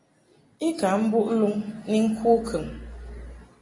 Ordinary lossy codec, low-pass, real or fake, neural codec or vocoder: AAC, 32 kbps; 10.8 kHz; real; none